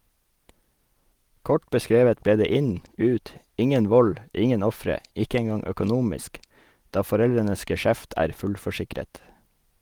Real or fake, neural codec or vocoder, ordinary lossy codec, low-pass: real; none; Opus, 24 kbps; 19.8 kHz